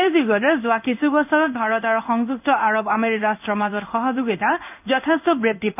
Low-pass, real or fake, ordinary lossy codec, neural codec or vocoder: 3.6 kHz; fake; none; codec, 16 kHz in and 24 kHz out, 1 kbps, XY-Tokenizer